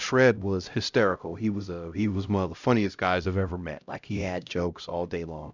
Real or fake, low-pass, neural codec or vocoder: fake; 7.2 kHz; codec, 16 kHz, 0.5 kbps, X-Codec, HuBERT features, trained on LibriSpeech